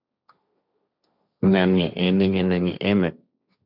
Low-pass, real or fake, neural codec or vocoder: 5.4 kHz; fake; codec, 16 kHz, 1.1 kbps, Voila-Tokenizer